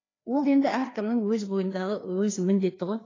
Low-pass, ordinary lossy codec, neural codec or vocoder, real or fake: 7.2 kHz; AAC, 32 kbps; codec, 16 kHz, 2 kbps, FreqCodec, larger model; fake